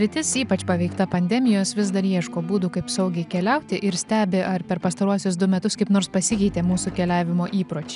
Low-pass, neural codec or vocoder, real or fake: 10.8 kHz; none; real